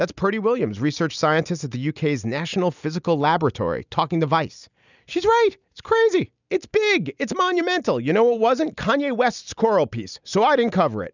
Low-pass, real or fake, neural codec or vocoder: 7.2 kHz; real; none